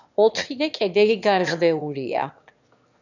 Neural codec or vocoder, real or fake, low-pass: autoencoder, 22.05 kHz, a latent of 192 numbers a frame, VITS, trained on one speaker; fake; 7.2 kHz